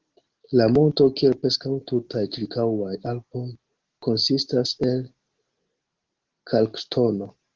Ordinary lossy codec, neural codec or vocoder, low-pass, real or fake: Opus, 16 kbps; none; 7.2 kHz; real